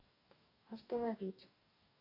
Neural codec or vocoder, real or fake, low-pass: codec, 44.1 kHz, 2.6 kbps, DAC; fake; 5.4 kHz